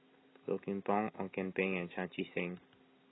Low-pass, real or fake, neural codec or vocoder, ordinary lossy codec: 7.2 kHz; real; none; AAC, 16 kbps